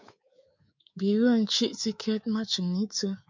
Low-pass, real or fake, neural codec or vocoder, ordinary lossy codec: 7.2 kHz; fake; codec, 24 kHz, 3.1 kbps, DualCodec; MP3, 64 kbps